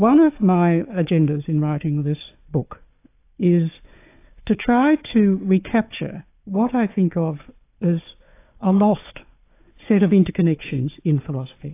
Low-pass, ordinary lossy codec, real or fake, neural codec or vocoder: 3.6 kHz; AAC, 24 kbps; fake; codec, 16 kHz, 4 kbps, FunCodec, trained on Chinese and English, 50 frames a second